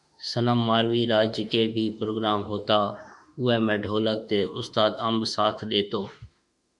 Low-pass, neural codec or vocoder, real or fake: 10.8 kHz; autoencoder, 48 kHz, 32 numbers a frame, DAC-VAE, trained on Japanese speech; fake